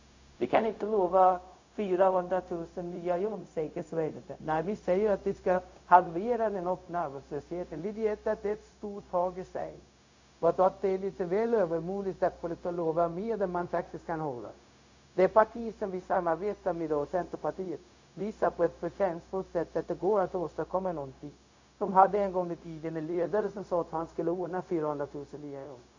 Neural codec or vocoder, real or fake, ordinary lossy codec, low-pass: codec, 16 kHz, 0.4 kbps, LongCat-Audio-Codec; fake; AAC, 48 kbps; 7.2 kHz